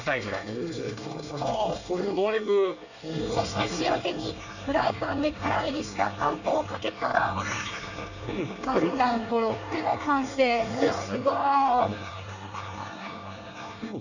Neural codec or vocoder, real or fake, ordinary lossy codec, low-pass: codec, 24 kHz, 1 kbps, SNAC; fake; none; 7.2 kHz